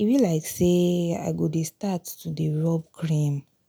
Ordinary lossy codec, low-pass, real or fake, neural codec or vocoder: none; none; real; none